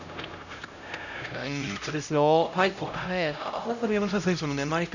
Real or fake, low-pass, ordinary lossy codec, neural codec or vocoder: fake; 7.2 kHz; none; codec, 16 kHz, 0.5 kbps, X-Codec, HuBERT features, trained on LibriSpeech